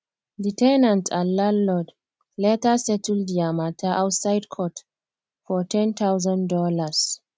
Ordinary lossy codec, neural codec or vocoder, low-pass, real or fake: none; none; none; real